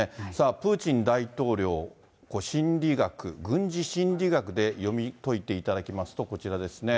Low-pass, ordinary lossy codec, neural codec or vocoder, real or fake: none; none; none; real